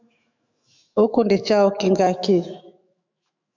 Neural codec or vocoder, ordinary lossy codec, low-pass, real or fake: autoencoder, 48 kHz, 128 numbers a frame, DAC-VAE, trained on Japanese speech; MP3, 64 kbps; 7.2 kHz; fake